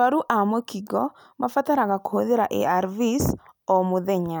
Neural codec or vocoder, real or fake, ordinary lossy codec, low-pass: none; real; none; none